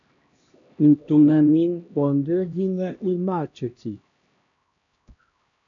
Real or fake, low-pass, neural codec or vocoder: fake; 7.2 kHz; codec, 16 kHz, 1 kbps, X-Codec, HuBERT features, trained on LibriSpeech